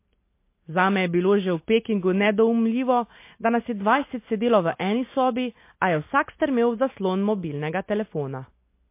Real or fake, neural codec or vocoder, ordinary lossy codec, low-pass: real; none; MP3, 24 kbps; 3.6 kHz